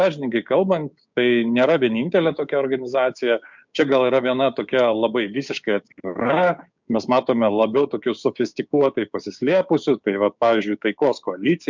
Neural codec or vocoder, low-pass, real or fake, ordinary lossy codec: codec, 16 kHz, 6 kbps, DAC; 7.2 kHz; fake; MP3, 64 kbps